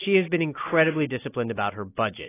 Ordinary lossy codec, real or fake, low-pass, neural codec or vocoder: AAC, 16 kbps; real; 3.6 kHz; none